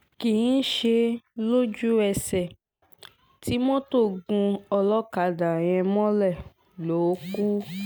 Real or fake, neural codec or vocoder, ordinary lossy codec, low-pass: real; none; none; none